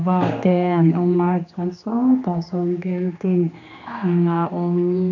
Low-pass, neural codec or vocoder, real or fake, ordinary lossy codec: 7.2 kHz; codec, 16 kHz, 2 kbps, X-Codec, HuBERT features, trained on general audio; fake; none